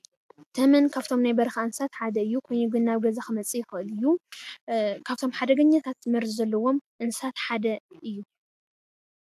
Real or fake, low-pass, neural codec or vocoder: real; 14.4 kHz; none